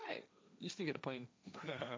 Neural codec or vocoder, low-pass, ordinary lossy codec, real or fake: codec, 16 kHz, 1.1 kbps, Voila-Tokenizer; none; none; fake